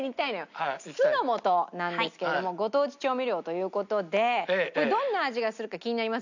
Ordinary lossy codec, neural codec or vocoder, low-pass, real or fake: none; none; 7.2 kHz; real